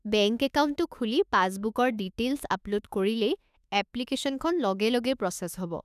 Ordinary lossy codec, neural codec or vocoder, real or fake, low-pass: none; autoencoder, 48 kHz, 32 numbers a frame, DAC-VAE, trained on Japanese speech; fake; 14.4 kHz